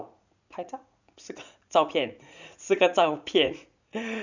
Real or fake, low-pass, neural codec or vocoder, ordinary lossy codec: fake; 7.2 kHz; vocoder, 44.1 kHz, 80 mel bands, Vocos; none